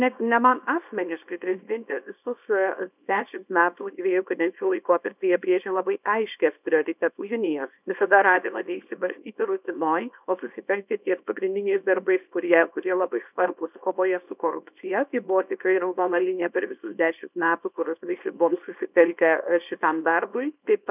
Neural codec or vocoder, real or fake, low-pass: codec, 24 kHz, 0.9 kbps, WavTokenizer, small release; fake; 3.6 kHz